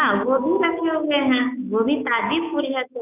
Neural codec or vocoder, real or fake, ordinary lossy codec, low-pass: autoencoder, 48 kHz, 128 numbers a frame, DAC-VAE, trained on Japanese speech; fake; none; 3.6 kHz